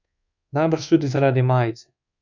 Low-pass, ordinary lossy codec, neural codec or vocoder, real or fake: 7.2 kHz; none; codec, 24 kHz, 1.2 kbps, DualCodec; fake